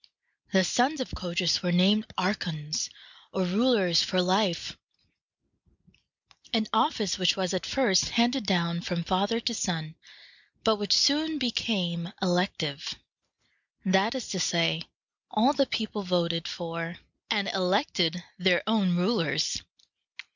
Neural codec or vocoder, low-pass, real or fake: none; 7.2 kHz; real